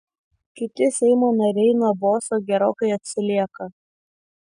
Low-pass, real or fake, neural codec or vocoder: 9.9 kHz; real; none